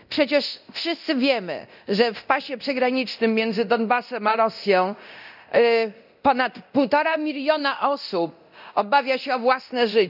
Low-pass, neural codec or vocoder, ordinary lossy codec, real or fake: 5.4 kHz; codec, 24 kHz, 0.9 kbps, DualCodec; none; fake